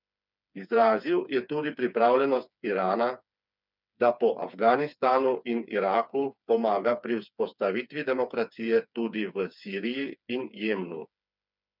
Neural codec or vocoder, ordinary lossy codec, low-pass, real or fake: codec, 16 kHz, 4 kbps, FreqCodec, smaller model; none; 5.4 kHz; fake